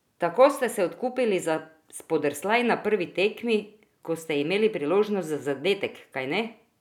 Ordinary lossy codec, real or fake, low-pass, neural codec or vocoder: none; real; 19.8 kHz; none